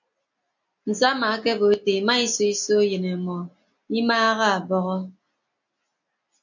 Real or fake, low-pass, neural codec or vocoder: real; 7.2 kHz; none